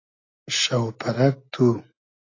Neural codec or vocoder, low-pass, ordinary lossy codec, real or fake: none; 7.2 kHz; AAC, 32 kbps; real